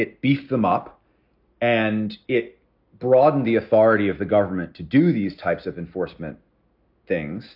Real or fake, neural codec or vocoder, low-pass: real; none; 5.4 kHz